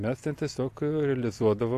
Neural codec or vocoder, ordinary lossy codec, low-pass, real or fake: vocoder, 44.1 kHz, 128 mel bands every 256 samples, BigVGAN v2; AAC, 64 kbps; 14.4 kHz; fake